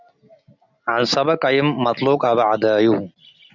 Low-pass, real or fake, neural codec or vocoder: 7.2 kHz; real; none